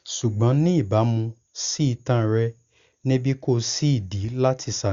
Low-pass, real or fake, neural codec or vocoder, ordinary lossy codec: 7.2 kHz; real; none; Opus, 64 kbps